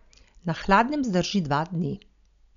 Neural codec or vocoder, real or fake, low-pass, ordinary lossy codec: none; real; 7.2 kHz; none